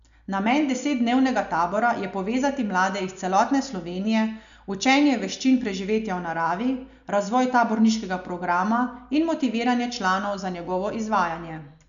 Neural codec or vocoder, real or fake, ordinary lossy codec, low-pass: none; real; none; 7.2 kHz